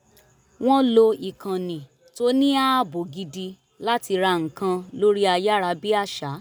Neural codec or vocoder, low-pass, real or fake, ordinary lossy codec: none; none; real; none